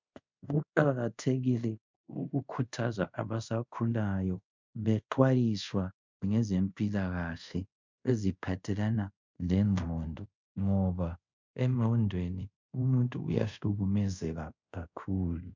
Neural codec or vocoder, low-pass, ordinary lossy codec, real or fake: codec, 24 kHz, 0.5 kbps, DualCodec; 7.2 kHz; MP3, 64 kbps; fake